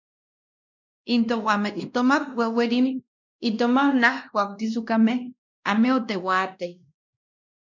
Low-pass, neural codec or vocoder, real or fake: 7.2 kHz; codec, 16 kHz, 1 kbps, X-Codec, WavLM features, trained on Multilingual LibriSpeech; fake